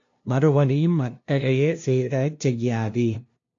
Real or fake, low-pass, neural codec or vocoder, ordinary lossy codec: fake; 7.2 kHz; codec, 16 kHz, 0.5 kbps, FunCodec, trained on LibriTTS, 25 frames a second; AAC, 48 kbps